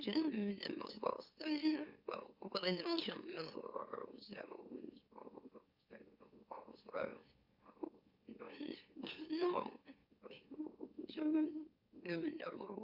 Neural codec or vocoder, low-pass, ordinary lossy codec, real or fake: autoencoder, 44.1 kHz, a latent of 192 numbers a frame, MeloTTS; 5.4 kHz; Opus, 64 kbps; fake